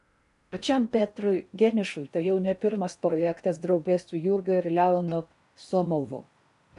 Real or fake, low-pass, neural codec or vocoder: fake; 10.8 kHz; codec, 16 kHz in and 24 kHz out, 0.6 kbps, FocalCodec, streaming, 4096 codes